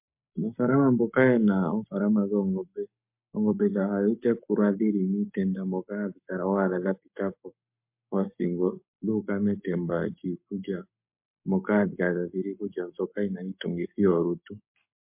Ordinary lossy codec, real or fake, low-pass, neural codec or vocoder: MP3, 32 kbps; fake; 3.6 kHz; codec, 44.1 kHz, 7.8 kbps, Pupu-Codec